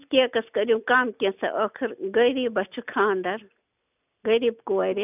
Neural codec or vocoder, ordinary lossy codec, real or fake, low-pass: none; none; real; 3.6 kHz